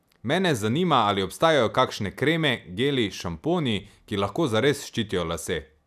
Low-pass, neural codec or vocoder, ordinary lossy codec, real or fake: 14.4 kHz; none; none; real